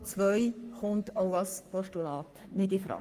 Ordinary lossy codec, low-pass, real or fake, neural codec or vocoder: Opus, 32 kbps; 14.4 kHz; fake; codec, 44.1 kHz, 3.4 kbps, Pupu-Codec